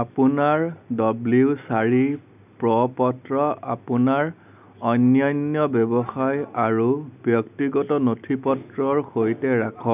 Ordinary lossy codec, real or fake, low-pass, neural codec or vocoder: none; real; 3.6 kHz; none